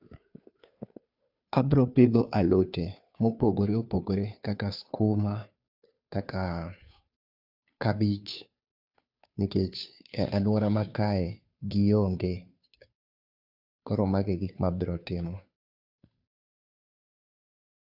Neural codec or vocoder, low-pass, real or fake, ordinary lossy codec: codec, 16 kHz, 2 kbps, FunCodec, trained on Chinese and English, 25 frames a second; 5.4 kHz; fake; AAC, 48 kbps